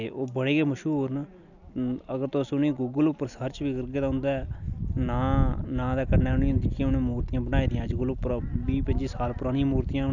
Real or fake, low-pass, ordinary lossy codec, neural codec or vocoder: real; 7.2 kHz; none; none